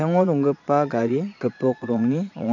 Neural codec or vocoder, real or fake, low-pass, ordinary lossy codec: vocoder, 22.05 kHz, 80 mel bands, Vocos; fake; 7.2 kHz; none